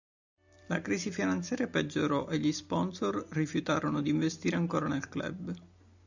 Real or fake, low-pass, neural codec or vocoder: real; 7.2 kHz; none